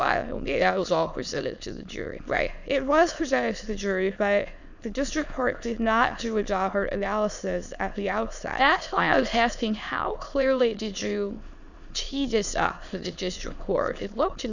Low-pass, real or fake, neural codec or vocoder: 7.2 kHz; fake; autoencoder, 22.05 kHz, a latent of 192 numbers a frame, VITS, trained on many speakers